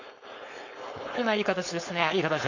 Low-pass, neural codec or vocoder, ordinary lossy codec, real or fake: 7.2 kHz; codec, 16 kHz, 4.8 kbps, FACodec; none; fake